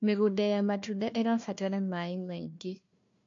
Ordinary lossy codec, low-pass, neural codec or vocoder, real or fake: MP3, 48 kbps; 7.2 kHz; codec, 16 kHz, 1 kbps, FunCodec, trained on Chinese and English, 50 frames a second; fake